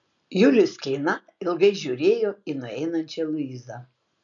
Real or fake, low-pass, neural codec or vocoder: real; 7.2 kHz; none